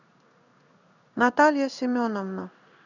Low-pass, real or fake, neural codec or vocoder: 7.2 kHz; fake; codec, 16 kHz in and 24 kHz out, 1 kbps, XY-Tokenizer